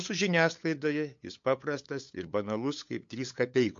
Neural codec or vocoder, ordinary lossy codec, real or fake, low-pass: codec, 16 kHz, 8 kbps, FunCodec, trained on LibriTTS, 25 frames a second; MP3, 48 kbps; fake; 7.2 kHz